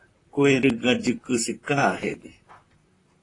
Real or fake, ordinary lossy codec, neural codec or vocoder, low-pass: fake; AAC, 32 kbps; vocoder, 44.1 kHz, 128 mel bands, Pupu-Vocoder; 10.8 kHz